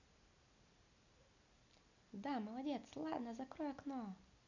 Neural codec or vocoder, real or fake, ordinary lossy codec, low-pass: none; real; none; 7.2 kHz